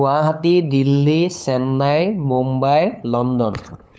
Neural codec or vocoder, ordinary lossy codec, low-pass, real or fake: codec, 16 kHz, 8 kbps, FunCodec, trained on LibriTTS, 25 frames a second; none; none; fake